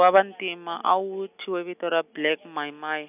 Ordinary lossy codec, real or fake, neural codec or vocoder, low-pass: none; real; none; 3.6 kHz